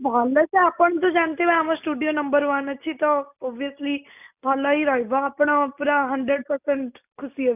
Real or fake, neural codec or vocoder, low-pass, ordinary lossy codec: real; none; 3.6 kHz; none